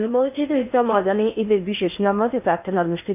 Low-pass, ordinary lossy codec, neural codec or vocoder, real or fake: 3.6 kHz; none; codec, 16 kHz in and 24 kHz out, 0.6 kbps, FocalCodec, streaming, 4096 codes; fake